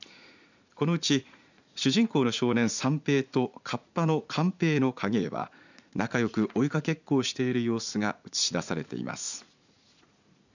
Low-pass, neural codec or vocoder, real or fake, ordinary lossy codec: 7.2 kHz; vocoder, 44.1 kHz, 80 mel bands, Vocos; fake; none